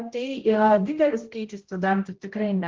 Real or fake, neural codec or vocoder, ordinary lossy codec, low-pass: fake; codec, 16 kHz, 0.5 kbps, X-Codec, HuBERT features, trained on general audio; Opus, 16 kbps; 7.2 kHz